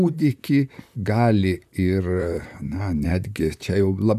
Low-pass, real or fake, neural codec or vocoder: 14.4 kHz; fake; vocoder, 44.1 kHz, 128 mel bands, Pupu-Vocoder